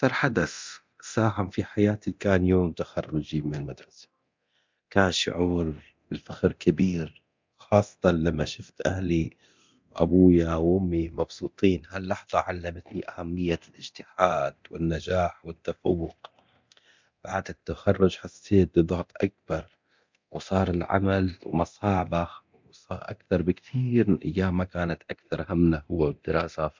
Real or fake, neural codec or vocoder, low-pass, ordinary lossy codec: fake; codec, 24 kHz, 0.9 kbps, DualCodec; 7.2 kHz; MP3, 64 kbps